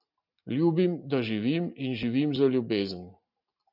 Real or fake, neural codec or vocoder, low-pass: real; none; 5.4 kHz